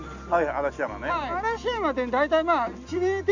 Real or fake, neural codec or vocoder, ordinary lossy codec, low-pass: real; none; none; 7.2 kHz